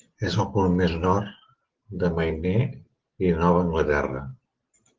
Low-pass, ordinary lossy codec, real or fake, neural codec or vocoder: 7.2 kHz; Opus, 16 kbps; real; none